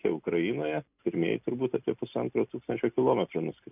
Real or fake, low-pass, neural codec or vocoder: real; 3.6 kHz; none